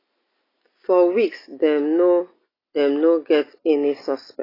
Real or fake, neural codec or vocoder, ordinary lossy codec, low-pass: real; none; AAC, 24 kbps; 5.4 kHz